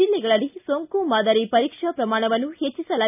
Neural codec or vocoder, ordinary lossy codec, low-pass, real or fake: none; none; 3.6 kHz; real